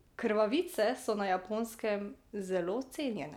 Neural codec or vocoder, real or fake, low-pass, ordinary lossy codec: none; real; 19.8 kHz; none